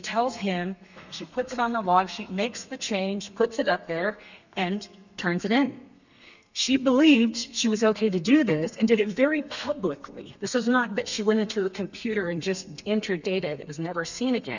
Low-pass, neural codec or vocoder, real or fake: 7.2 kHz; codec, 32 kHz, 1.9 kbps, SNAC; fake